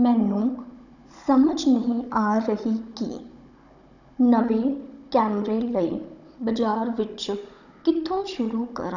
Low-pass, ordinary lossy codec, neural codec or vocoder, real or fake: 7.2 kHz; none; codec, 16 kHz, 16 kbps, FunCodec, trained on Chinese and English, 50 frames a second; fake